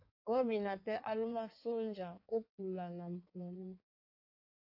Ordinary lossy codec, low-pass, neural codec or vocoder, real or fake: AAC, 48 kbps; 5.4 kHz; codec, 16 kHz in and 24 kHz out, 1.1 kbps, FireRedTTS-2 codec; fake